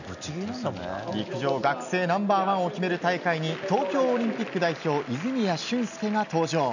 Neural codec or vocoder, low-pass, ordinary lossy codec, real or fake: none; 7.2 kHz; none; real